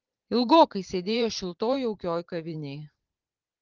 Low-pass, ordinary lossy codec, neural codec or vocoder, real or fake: 7.2 kHz; Opus, 16 kbps; vocoder, 44.1 kHz, 128 mel bands every 512 samples, BigVGAN v2; fake